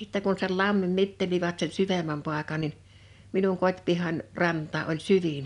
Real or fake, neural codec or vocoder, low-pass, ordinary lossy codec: real; none; 10.8 kHz; none